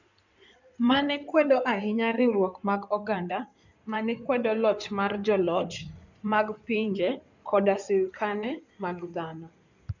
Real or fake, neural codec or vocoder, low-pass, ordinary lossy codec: fake; codec, 16 kHz in and 24 kHz out, 2.2 kbps, FireRedTTS-2 codec; 7.2 kHz; none